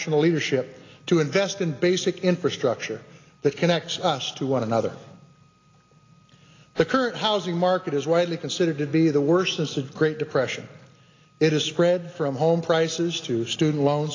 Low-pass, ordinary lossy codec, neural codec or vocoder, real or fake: 7.2 kHz; AAC, 32 kbps; none; real